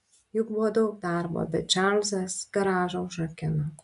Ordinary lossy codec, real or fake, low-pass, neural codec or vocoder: MP3, 96 kbps; real; 10.8 kHz; none